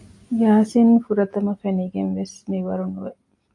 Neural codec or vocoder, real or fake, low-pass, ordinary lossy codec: none; real; 10.8 kHz; AAC, 48 kbps